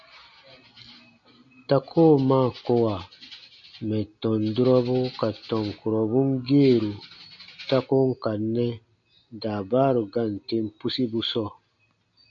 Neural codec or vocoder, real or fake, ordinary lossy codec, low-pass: none; real; MP3, 48 kbps; 7.2 kHz